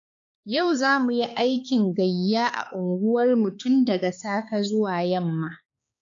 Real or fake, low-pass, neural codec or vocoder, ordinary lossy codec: fake; 7.2 kHz; codec, 16 kHz, 4 kbps, X-Codec, HuBERT features, trained on balanced general audio; AAC, 48 kbps